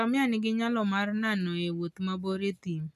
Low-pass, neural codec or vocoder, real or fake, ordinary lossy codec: 14.4 kHz; none; real; none